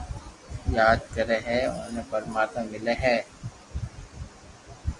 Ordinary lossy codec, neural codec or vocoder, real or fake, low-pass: Opus, 64 kbps; none; real; 10.8 kHz